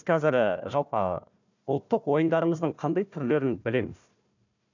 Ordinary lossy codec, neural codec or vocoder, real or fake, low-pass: none; codec, 16 kHz, 1 kbps, FunCodec, trained on Chinese and English, 50 frames a second; fake; 7.2 kHz